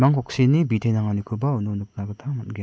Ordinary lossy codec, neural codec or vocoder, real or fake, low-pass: none; none; real; none